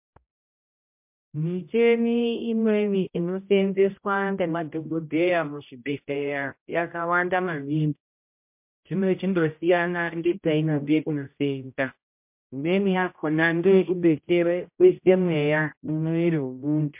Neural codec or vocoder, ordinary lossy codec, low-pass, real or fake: codec, 16 kHz, 0.5 kbps, X-Codec, HuBERT features, trained on general audio; MP3, 32 kbps; 3.6 kHz; fake